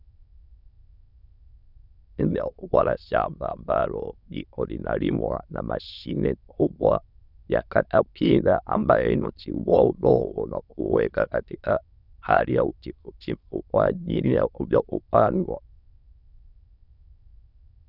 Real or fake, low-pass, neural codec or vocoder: fake; 5.4 kHz; autoencoder, 22.05 kHz, a latent of 192 numbers a frame, VITS, trained on many speakers